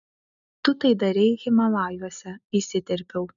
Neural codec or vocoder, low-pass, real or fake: none; 7.2 kHz; real